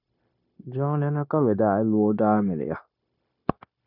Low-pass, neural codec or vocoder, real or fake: 5.4 kHz; codec, 16 kHz, 0.9 kbps, LongCat-Audio-Codec; fake